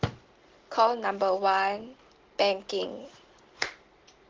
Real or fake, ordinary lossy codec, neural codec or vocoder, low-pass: real; Opus, 16 kbps; none; 7.2 kHz